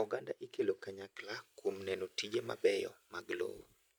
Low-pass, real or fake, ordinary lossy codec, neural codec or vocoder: none; real; none; none